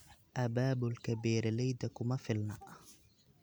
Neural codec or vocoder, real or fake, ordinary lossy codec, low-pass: none; real; none; none